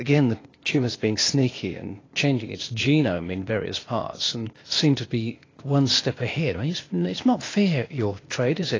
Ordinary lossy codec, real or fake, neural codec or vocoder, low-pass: AAC, 32 kbps; fake; codec, 16 kHz, 0.8 kbps, ZipCodec; 7.2 kHz